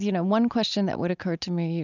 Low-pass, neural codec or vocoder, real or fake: 7.2 kHz; none; real